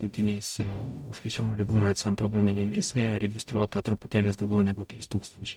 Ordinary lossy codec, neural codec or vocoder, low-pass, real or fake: MP3, 96 kbps; codec, 44.1 kHz, 0.9 kbps, DAC; 19.8 kHz; fake